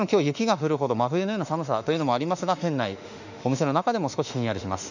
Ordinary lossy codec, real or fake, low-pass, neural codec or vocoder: none; fake; 7.2 kHz; autoencoder, 48 kHz, 32 numbers a frame, DAC-VAE, trained on Japanese speech